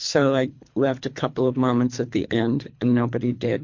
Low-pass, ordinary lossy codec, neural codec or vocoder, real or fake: 7.2 kHz; MP3, 48 kbps; codec, 24 kHz, 3 kbps, HILCodec; fake